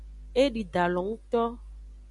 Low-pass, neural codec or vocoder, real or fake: 10.8 kHz; none; real